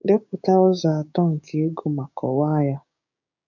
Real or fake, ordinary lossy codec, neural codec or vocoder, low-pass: fake; none; codec, 16 kHz, 6 kbps, DAC; 7.2 kHz